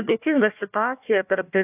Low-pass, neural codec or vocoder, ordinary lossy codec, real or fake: 3.6 kHz; codec, 16 kHz, 1 kbps, FunCodec, trained on Chinese and English, 50 frames a second; AAC, 32 kbps; fake